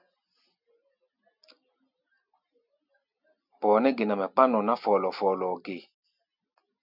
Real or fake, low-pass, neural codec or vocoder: real; 5.4 kHz; none